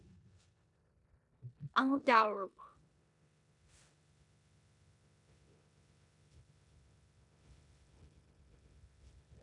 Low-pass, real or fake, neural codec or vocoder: 10.8 kHz; fake; codec, 16 kHz in and 24 kHz out, 0.9 kbps, LongCat-Audio-Codec, four codebook decoder